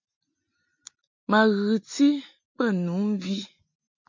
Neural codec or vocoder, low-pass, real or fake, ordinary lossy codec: none; 7.2 kHz; real; MP3, 48 kbps